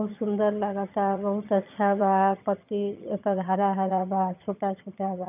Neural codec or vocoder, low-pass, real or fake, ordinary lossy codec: vocoder, 22.05 kHz, 80 mel bands, HiFi-GAN; 3.6 kHz; fake; none